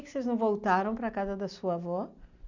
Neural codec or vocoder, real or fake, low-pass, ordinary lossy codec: none; real; 7.2 kHz; none